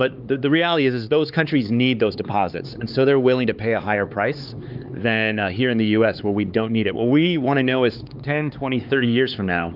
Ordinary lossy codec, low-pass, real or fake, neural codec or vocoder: Opus, 32 kbps; 5.4 kHz; fake; codec, 16 kHz, 4 kbps, X-Codec, HuBERT features, trained on LibriSpeech